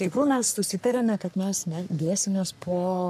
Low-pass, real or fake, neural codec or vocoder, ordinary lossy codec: 14.4 kHz; fake; codec, 44.1 kHz, 3.4 kbps, Pupu-Codec; AAC, 96 kbps